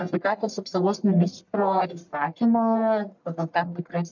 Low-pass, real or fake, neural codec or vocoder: 7.2 kHz; fake; codec, 44.1 kHz, 1.7 kbps, Pupu-Codec